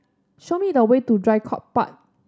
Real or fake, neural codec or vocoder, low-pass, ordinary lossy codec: real; none; none; none